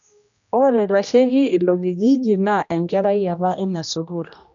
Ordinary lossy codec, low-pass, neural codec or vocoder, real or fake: none; 7.2 kHz; codec, 16 kHz, 1 kbps, X-Codec, HuBERT features, trained on general audio; fake